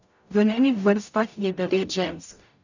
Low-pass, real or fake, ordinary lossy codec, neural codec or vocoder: 7.2 kHz; fake; none; codec, 44.1 kHz, 0.9 kbps, DAC